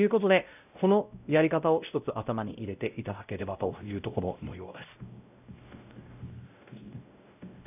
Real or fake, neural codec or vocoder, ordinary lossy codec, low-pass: fake; codec, 16 kHz, 0.5 kbps, X-Codec, WavLM features, trained on Multilingual LibriSpeech; none; 3.6 kHz